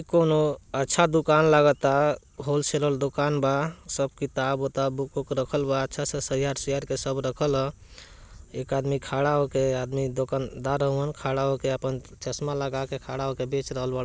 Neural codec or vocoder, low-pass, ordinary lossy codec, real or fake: none; none; none; real